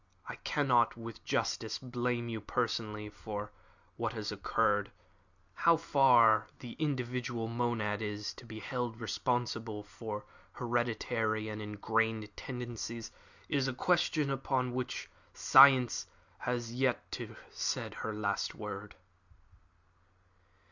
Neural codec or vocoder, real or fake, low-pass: none; real; 7.2 kHz